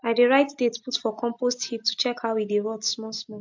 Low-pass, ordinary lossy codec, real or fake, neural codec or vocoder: 7.2 kHz; MP3, 48 kbps; real; none